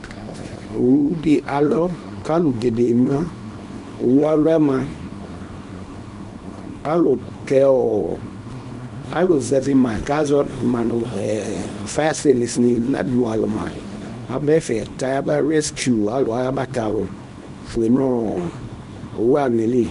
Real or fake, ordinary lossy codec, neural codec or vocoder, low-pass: fake; MP3, 64 kbps; codec, 24 kHz, 0.9 kbps, WavTokenizer, small release; 10.8 kHz